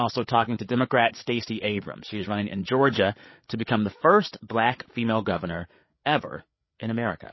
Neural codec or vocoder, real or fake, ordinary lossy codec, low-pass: codec, 16 kHz, 8 kbps, FreqCodec, larger model; fake; MP3, 24 kbps; 7.2 kHz